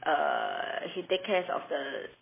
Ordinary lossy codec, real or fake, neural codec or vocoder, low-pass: MP3, 16 kbps; real; none; 3.6 kHz